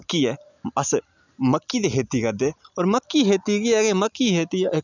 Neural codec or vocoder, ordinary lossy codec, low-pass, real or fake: none; none; 7.2 kHz; real